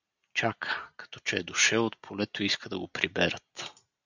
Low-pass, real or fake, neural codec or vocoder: 7.2 kHz; real; none